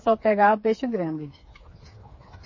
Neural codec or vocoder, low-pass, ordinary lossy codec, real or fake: codec, 16 kHz, 4 kbps, FreqCodec, smaller model; 7.2 kHz; MP3, 32 kbps; fake